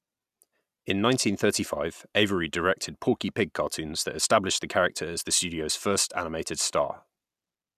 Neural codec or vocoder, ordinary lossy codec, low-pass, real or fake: none; none; 14.4 kHz; real